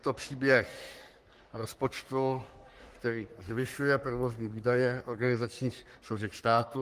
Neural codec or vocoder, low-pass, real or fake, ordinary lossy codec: codec, 44.1 kHz, 3.4 kbps, Pupu-Codec; 14.4 kHz; fake; Opus, 24 kbps